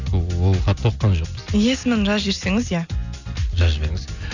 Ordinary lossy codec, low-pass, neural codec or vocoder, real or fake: none; 7.2 kHz; none; real